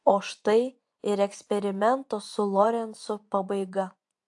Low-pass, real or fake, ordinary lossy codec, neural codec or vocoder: 10.8 kHz; fake; AAC, 64 kbps; vocoder, 24 kHz, 100 mel bands, Vocos